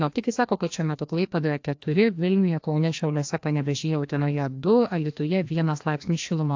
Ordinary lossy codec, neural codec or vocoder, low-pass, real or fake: AAC, 48 kbps; codec, 16 kHz, 1 kbps, FreqCodec, larger model; 7.2 kHz; fake